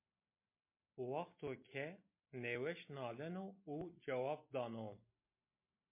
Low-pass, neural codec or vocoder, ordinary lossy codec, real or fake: 3.6 kHz; none; MP3, 24 kbps; real